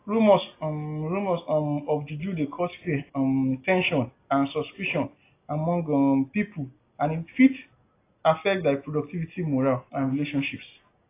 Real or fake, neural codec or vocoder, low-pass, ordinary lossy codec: real; none; 3.6 kHz; AAC, 24 kbps